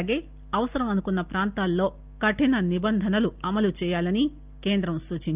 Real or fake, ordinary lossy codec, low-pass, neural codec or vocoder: real; Opus, 24 kbps; 3.6 kHz; none